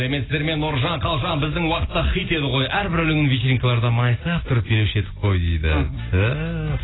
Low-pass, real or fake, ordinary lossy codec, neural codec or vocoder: 7.2 kHz; real; AAC, 16 kbps; none